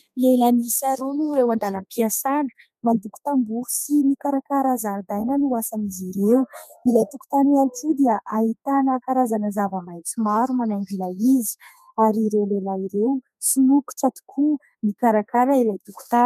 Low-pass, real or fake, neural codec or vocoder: 14.4 kHz; fake; codec, 32 kHz, 1.9 kbps, SNAC